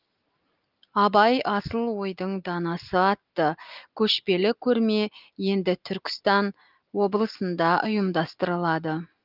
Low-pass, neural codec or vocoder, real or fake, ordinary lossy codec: 5.4 kHz; none; real; Opus, 24 kbps